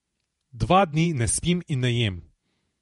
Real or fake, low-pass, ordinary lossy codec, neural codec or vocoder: fake; 19.8 kHz; MP3, 48 kbps; vocoder, 48 kHz, 128 mel bands, Vocos